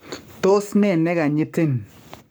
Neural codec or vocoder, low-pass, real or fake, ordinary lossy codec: codec, 44.1 kHz, 7.8 kbps, Pupu-Codec; none; fake; none